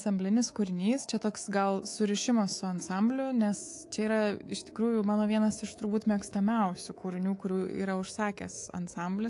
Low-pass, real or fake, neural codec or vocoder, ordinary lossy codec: 10.8 kHz; fake; codec, 24 kHz, 3.1 kbps, DualCodec; AAC, 48 kbps